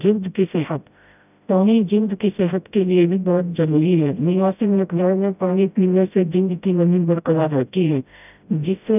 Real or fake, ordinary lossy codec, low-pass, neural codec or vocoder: fake; none; 3.6 kHz; codec, 16 kHz, 0.5 kbps, FreqCodec, smaller model